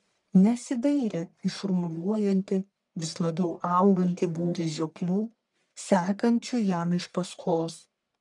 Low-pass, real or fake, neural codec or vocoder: 10.8 kHz; fake; codec, 44.1 kHz, 1.7 kbps, Pupu-Codec